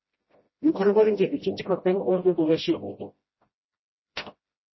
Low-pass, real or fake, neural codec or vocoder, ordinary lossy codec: 7.2 kHz; fake; codec, 16 kHz, 0.5 kbps, FreqCodec, smaller model; MP3, 24 kbps